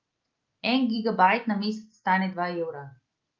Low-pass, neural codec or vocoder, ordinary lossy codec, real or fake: 7.2 kHz; none; Opus, 32 kbps; real